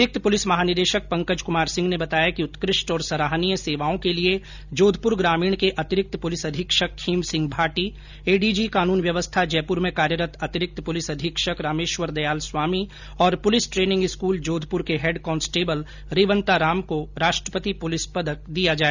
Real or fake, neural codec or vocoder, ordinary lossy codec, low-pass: real; none; none; 7.2 kHz